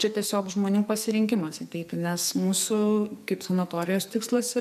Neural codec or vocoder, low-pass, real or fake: codec, 44.1 kHz, 2.6 kbps, SNAC; 14.4 kHz; fake